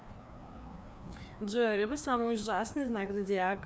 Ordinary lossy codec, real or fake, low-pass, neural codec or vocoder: none; fake; none; codec, 16 kHz, 2 kbps, FreqCodec, larger model